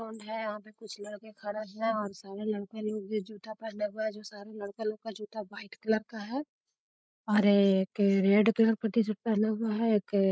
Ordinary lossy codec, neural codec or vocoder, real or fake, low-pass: none; codec, 16 kHz, 16 kbps, FreqCodec, larger model; fake; none